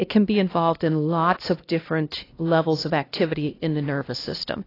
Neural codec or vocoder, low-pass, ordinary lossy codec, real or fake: codec, 16 kHz, 1 kbps, X-Codec, HuBERT features, trained on LibriSpeech; 5.4 kHz; AAC, 24 kbps; fake